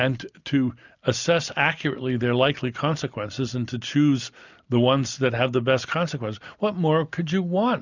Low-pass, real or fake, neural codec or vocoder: 7.2 kHz; real; none